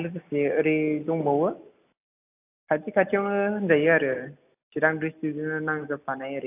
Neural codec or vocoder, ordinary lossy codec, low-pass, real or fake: none; AAC, 32 kbps; 3.6 kHz; real